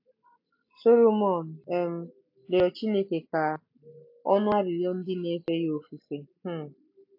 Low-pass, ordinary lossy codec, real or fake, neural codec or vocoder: 5.4 kHz; AAC, 48 kbps; real; none